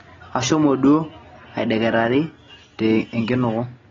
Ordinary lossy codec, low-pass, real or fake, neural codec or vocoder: AAC, 24 kbps; 7.2 kHz; real; none